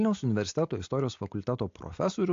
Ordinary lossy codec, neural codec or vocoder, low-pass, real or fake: AAC, 48 kbps; none; 7.2 kHz; real